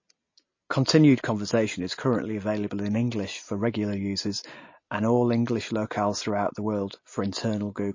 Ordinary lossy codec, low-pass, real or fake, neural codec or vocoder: MP3, 32 kbps; 7.2 kHz; real; none